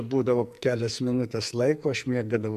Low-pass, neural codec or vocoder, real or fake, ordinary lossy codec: 14.4 kHz; codec, 44.1 kHz, 2.6 kbps, SNAC; fake; MP3, 96 kbps